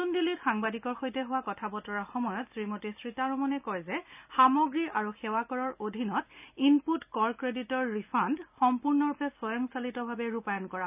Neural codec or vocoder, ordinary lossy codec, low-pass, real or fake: none; none; 3.6 kHz; real